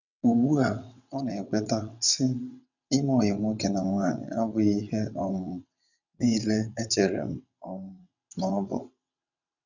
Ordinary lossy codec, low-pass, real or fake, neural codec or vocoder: none; 7.2 kHz; fake; vocoder, 22.05 kHz, 80 mel bands, WaveNeXt